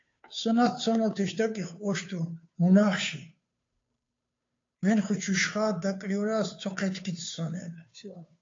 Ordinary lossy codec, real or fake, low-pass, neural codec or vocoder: AAC, 48 kbps; fake; 7.2 kHz; codec, 16 kHz, 2 kbps, FunCodec, trained on Chinese and English, 25 frames a second